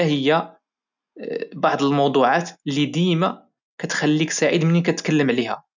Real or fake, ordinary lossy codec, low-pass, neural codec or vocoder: real; none; 7.2 kHz; none